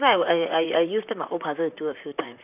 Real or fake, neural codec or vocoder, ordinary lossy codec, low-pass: fake; vocoder, 44.1 kHz, 128 mel bands, Pupu-Vocoder; none; 3.6 kHz